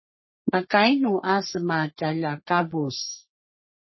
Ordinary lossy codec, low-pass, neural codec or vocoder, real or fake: MP3, 24 kbps; 7.2 kHz; codec, 44.1 kHz, 3.4 kbps, Pupu-Codec; fake